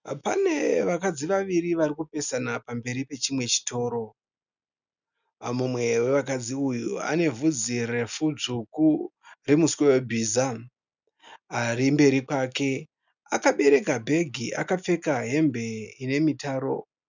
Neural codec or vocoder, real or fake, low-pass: none; real; 7.2 kHz